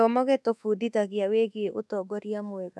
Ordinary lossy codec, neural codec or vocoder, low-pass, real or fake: none; codec, 24 kHz, 1.2 kbps, DualCodec; none; fake